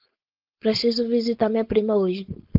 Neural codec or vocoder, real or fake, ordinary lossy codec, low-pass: codec, 16 kHz, 4.8 kbps, FACodec; fake; Opus, 32 kbps; 5.4 kHz